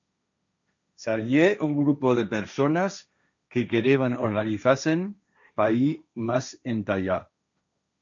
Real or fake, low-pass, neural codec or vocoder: fake; 7.2 kHz; codec, 16 kHz, 1.1 kbps, Voila-Tokenizer